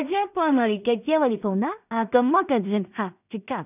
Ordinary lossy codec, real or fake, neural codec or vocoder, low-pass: none; fake; codec, 16 kHz in and 24 kHz out, 0.4 kbps, LongCat-Audio-Codec, two codebook decoder; 3.6 kHz